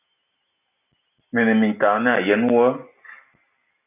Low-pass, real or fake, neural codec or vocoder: 3.6 kHz; real; none